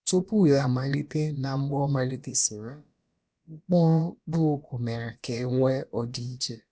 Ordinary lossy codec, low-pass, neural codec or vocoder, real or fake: none; none; codec, 16 kHz, about 1 kbps, DyCAST, with the encoder's durations; fake